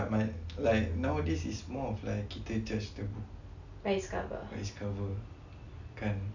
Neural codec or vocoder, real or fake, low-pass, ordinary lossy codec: none; real; 7.2 kHz; none